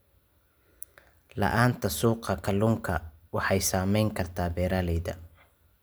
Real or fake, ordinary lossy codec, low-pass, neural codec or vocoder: real; none; none; none